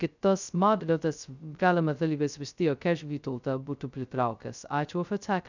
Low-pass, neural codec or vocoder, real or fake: 7.2 kHz; codec, 16 kHz, 0.2 kbps, FocalCodec; fake